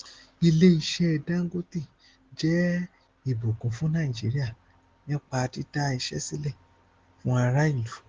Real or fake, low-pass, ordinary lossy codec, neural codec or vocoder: real; 7.2 kHz; Opus, 16 kbps; none